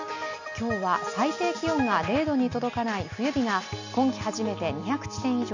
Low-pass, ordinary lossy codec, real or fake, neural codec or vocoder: 7.2 kHz; none; real; none